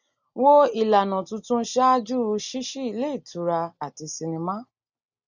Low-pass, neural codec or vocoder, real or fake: 7.2 kHz; none; real